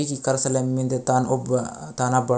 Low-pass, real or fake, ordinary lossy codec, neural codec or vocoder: none; real; none; none